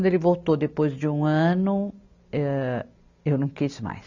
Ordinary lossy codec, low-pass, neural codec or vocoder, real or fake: none; 7.2 kHz; none; real